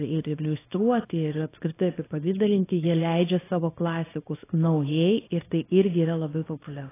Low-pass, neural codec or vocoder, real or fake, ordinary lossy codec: 3.6 kHz; codec, 24 kHz, 0.9 kbps, WavTokenizer, small release; fake; AAC, 16 kbps